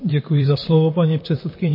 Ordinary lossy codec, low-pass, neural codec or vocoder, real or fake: MP3, 24 kbps; 5.4 kHz; vocoder, 44.1 kHz, 128 mel bands, Pupu-Vocoder; fake